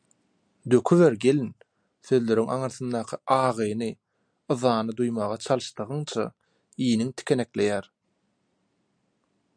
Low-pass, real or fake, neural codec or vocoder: 9.9 kHz; real; none